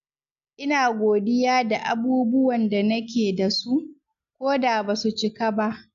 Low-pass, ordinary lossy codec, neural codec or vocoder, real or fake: 7.2 kHz; none; none; real